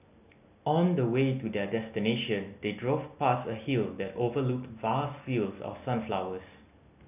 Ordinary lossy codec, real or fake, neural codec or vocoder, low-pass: none; real; none; 3.6 kHz